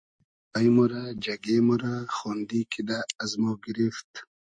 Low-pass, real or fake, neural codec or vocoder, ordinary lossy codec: 9.9 kHz; real; none; MP3, 48 kbps